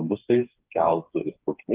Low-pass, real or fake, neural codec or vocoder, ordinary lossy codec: 3.6 kHz; fake; codec, 16 kHz, 4 kbps, FreqCodec, smaller model; Opus, 32 kbps